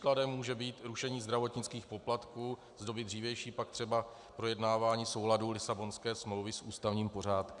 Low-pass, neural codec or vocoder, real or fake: 10.8 kHz; none; real